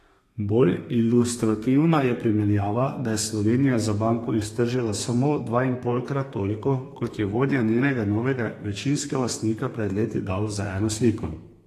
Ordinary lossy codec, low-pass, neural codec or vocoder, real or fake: AAC, 48 kbps; 14.4 kHz; codec, 32 kHz, 1.9 kbps, SNAC; fake